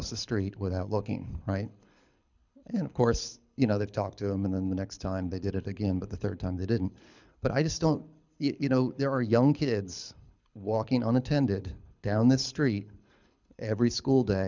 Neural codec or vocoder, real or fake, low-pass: codec, 24 kHz, 6 kbps, HILCodec; fake; 7.2 kHz